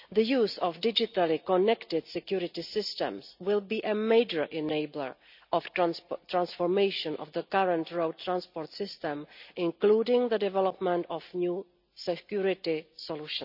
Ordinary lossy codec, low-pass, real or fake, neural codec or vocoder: none; 5.4 kHz; real; none